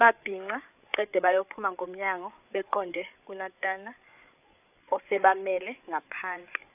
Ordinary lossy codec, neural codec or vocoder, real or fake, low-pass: AAC, 32 kbps; codec, 16 kHz, 8 kbps, FreqCodec, larger model; fake; 3.6 kHz